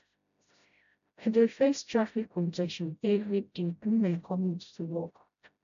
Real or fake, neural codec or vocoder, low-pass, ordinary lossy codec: fake; codec, 16 kHz, 0.5 kbps, FreqCodec, smaller model; 7.2 kHz; AAC, 96 kbps